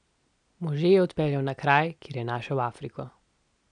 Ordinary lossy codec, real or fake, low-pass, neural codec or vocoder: none; real; 9.9 kHz; none